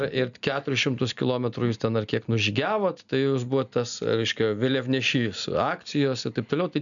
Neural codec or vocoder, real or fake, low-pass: none; real; 7.2 kHz